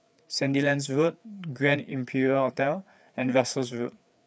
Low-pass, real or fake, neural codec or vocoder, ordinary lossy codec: none; fake; codec, 16 kHz, 4 kbps, FreqCodec, larger model; none